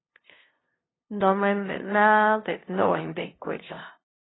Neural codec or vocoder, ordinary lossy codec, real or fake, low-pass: codec, 16 kHz, 0.5 kbps, FunCodec, trained on LibriTTS, 25 frames a second; AAC, 16 kbps; fake; 7.2 kHz